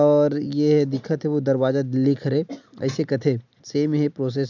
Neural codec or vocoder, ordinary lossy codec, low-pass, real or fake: none; none; 7.2 kHz; real